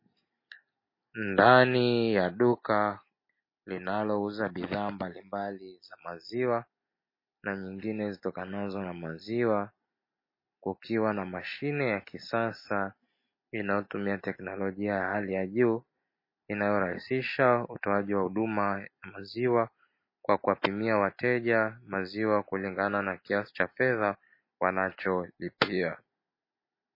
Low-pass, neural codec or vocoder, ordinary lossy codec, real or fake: 5.4 kHz; none; MP3, 24 kbps; real